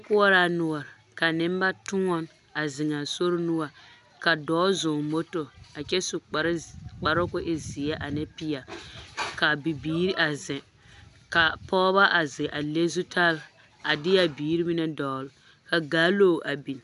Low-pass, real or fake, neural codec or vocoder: 10.8 kHz; real; none